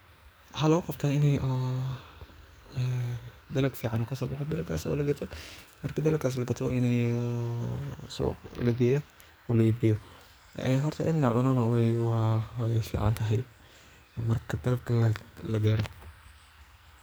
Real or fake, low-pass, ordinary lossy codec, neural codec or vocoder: fake; none; none; codec, 44.1 kHz, 2.6 kbps, SNAC